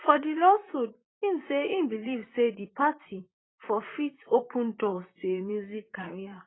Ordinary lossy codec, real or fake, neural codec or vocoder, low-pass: AAC, 16 kbps; fake; vocoder, 44.1 kHz, 128 mel bands, Pupu-Vocoder; 7.2 kHz